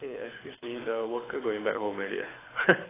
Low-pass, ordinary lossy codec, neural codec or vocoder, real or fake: 3.6 kHz; AAC, 16 kbps; codec, 24 kHz, 6 kbps, HILCodec; fake